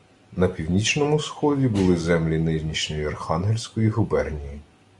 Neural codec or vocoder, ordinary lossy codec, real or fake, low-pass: none; Opus, 64 kbps; real; 10.8 kHz